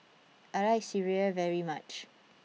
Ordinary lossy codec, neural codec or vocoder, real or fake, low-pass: none; none; real; none